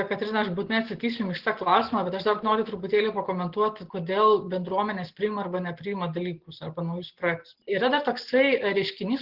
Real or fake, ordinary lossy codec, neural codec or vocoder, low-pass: real; Opus, 16 kbps; none; 5.4 kHz